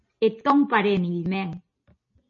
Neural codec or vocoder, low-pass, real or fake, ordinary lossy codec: none; 7.2 kHz; real; MP3, 32 kbps